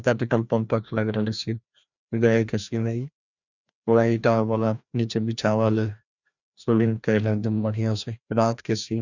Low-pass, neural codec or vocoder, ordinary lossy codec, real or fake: 7.2 kHz; codec, 16 kHz, 1 kbps, FreqCodec, larger model; none; fake